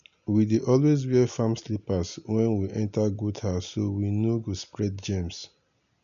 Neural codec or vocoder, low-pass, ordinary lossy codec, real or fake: none; 7.2 kHz; none; real